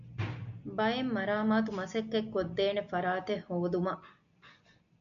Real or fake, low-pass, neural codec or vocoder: real; 7.2 kHz; none